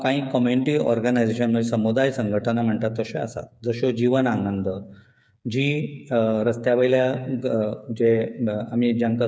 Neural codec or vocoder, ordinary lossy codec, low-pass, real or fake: codec, 16 kHz, 8 kbps, FreqCodec, smaller model; none; none; fake